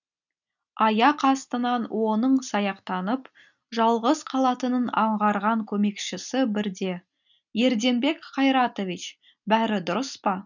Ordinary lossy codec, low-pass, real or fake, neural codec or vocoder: none; 7.2 kHz; real; none